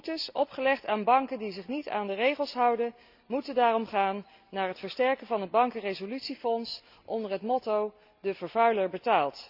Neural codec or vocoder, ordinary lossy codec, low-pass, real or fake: none; Opus, 64 kbps; 5.4 kHz; real